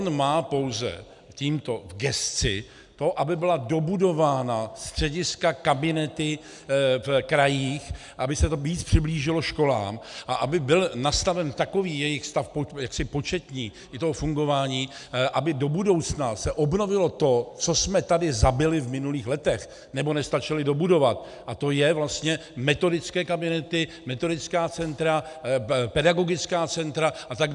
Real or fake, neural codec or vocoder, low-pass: real; none; 10.8 kHz